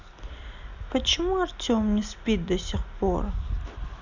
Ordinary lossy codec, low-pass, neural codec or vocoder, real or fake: none; 7.2 kHz; none; real